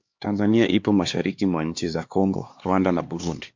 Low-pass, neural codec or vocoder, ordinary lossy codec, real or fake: 7.2 kHz; codec, 16 kHz, 2 kbps, X-Codec, HuBERT features, trained on LibriSpeech; MP3, 48 kbps; fake